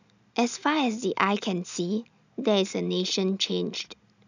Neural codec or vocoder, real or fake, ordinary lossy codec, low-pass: none; real; none; 7.2 kHz